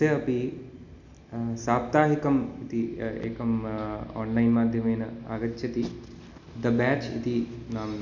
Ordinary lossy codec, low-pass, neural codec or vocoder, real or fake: none; 7.2 kHz; none; real